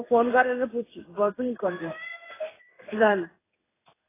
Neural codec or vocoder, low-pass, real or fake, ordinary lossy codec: codec, 16 kHz in and 24 kHz out, 1 kbps, XY-Tokenizer; 3.6 kHz; fake; AAC, 16 kbps